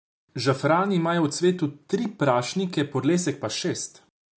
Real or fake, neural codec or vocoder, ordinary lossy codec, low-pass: real; none; none; none